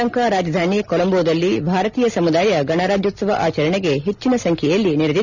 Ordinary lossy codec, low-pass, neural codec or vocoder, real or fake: none; 7.2 kHz; none; real